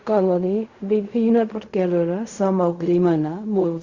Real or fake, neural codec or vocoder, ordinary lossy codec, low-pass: fake; codec, 16 kHz in and 24 kHz out, 0.4 kbps, LongCat-Audio-Codec, fine tuned four codebook decoder; Opus, 64 kbps; 7.2 kHz